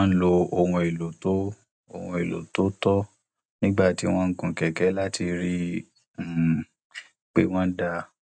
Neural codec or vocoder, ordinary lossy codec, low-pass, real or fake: none; none; 9.9 kHz; real